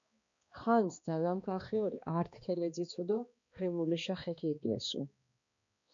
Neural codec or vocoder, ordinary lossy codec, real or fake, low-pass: codec, 16 kHz, 2 kbps, X-Codec, HuBERT features, trained on balanced general audio; MP3, 64 kbps; fake; 7.2 kHz